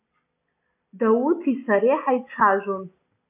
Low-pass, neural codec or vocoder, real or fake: 3.6 kHz; none; real